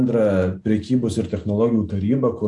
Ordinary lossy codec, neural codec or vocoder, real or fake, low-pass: AAC, 64 kbps; none; real; 10.8 kHz